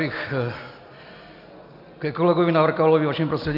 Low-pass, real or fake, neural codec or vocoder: 5.4 kHz; real; none